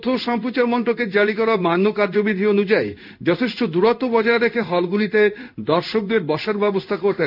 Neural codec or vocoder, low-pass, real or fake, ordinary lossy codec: codec, 16 kHz in and 24 kHz out, 1 kbps, XY-Tokenizer; 5.4 kHz; fake; none